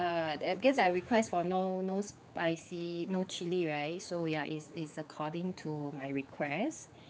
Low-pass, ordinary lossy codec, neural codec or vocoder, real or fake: none; none; codec, 16 kHz, 4 kbps, X-Codec, HuBERT features, trained on general audio; fake